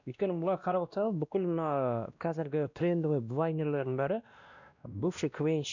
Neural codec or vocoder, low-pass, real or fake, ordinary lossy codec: codec, 16 kHz, 1 kbps, X-Codec, WavLM features, trained on Multilingual LibriSpeech; 7.2 kHz; fake; none